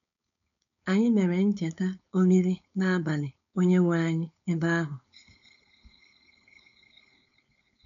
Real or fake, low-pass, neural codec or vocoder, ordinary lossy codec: fake; 7.2 kHz; codec, 16 kHz, 4.8 kbps, FACodec; none